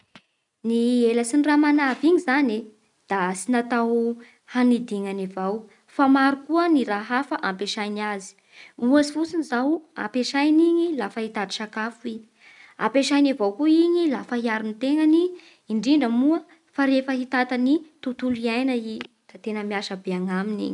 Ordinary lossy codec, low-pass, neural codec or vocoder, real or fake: none; 10.8 kHz; none; real